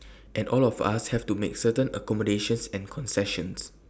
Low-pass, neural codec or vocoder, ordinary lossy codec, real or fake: none; none; none; real